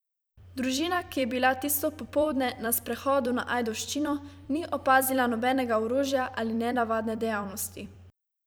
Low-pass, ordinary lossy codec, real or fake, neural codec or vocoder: none; none; fake; vocoder, 44.1 kHz, 128 mel bands every 256 samples, BigVGAN v2